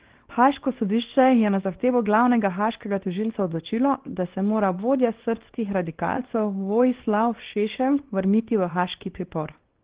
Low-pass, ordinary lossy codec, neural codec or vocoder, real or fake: 3.6 kHz; Opus, 16 kbps; codec, 24 kHz, 0.9 kbps, WavTokenizer, small release; fake